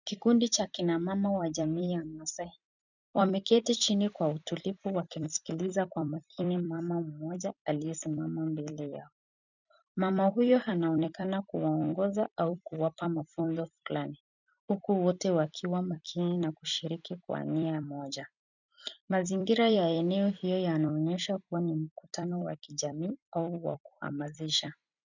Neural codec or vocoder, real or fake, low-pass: vocoder, 44.1 kHz, 128 mel bands every 256 samples, BigVGAN v2; fake; 7.2 kHz